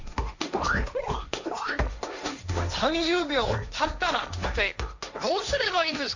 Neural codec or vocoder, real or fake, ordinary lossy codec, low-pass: codec, 16 kHz, 1.1 kbps, Voila-Tokenizer; fake; none; 7.2 kHz